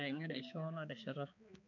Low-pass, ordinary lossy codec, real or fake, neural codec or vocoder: 7.2 kHz; MP3, 64 kbps; fake; codec, 16 kHz, 4 kbps, X-Codec, HuBERT features, trained on balanced general audio